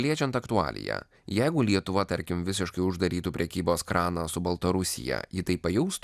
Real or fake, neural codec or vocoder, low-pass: real; none; 14.4 kHz